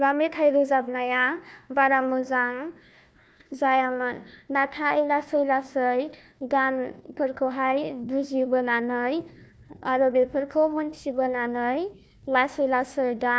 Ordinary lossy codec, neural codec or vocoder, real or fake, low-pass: none; codec, 16 kHz, 1 kbps, FunCodec, trained on Chinese and English, 50 frames a second; fake; none